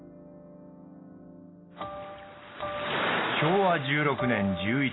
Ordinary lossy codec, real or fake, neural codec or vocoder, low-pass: AAC, 16 kbps; real; none; 7.2 kHz